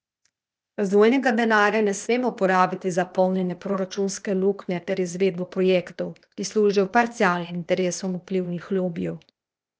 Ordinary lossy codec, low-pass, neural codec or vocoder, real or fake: none; none; codec, 16 kHz, 0.8 kbps, ZipCodec; fake